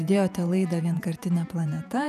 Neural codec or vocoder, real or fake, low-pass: vocoder, 48 kHz, 128 mel bands, Vocos; fake; 14.4 kHz